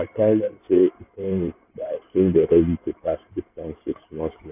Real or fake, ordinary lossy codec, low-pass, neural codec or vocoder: fake; none; 3.6 kHz; codec, 16 kHz in and 24 kHz out, 2.2 kbps, FireRedTTS-2 codec